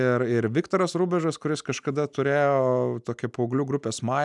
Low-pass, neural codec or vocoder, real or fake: 10.8 kHz; none; real